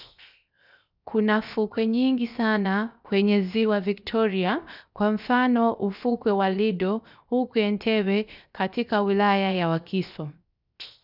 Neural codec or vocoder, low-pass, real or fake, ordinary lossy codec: codec, 16 kHz, 0.7 kbps, FocalCodec; 5.4 kHz; fake; none